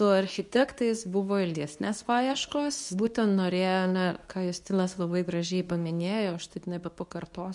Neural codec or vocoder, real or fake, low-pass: codec, 24 kHz, 0.9 kbps, WavTokenizer, medium speech release version 2; fake; 10.8 kHz